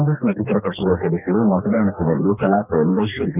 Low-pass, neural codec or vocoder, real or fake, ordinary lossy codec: 3.6 kHz; codec, 44.1 kHz, 2.6 kbps, DAC; fake; none